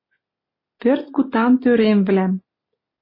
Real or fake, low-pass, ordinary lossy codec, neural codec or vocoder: real; 5.4 kHz; MP3, 24 kbps; none